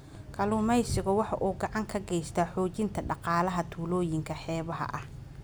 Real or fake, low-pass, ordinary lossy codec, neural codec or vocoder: real; none; none; none